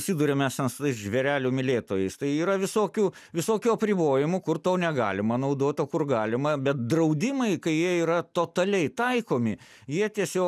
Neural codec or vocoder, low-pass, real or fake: none; 14.4 kHz; real